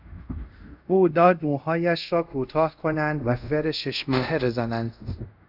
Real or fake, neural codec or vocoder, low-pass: fake; codec, 24 kHz, 0.5 kbps, DualCodec; 5.4 kHz